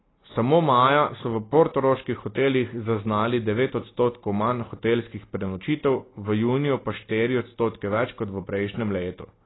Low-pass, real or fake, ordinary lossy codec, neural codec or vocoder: 7.2 kHz; real; AAC, 16 kbps; none